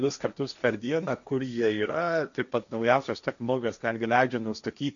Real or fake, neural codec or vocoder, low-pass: fake; codec, 16 kHz, 1.1 kbps, Voila-Tokenizer; 7.2 kHz